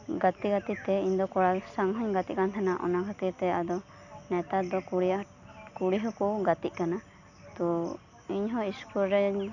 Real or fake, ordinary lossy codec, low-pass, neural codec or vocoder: real; none; 7.2 kHz; none